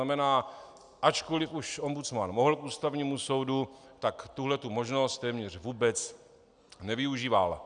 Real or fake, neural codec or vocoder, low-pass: real; none; 9.9 kHz